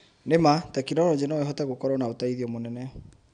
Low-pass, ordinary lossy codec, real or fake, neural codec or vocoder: 9.9 kHz; none; real; none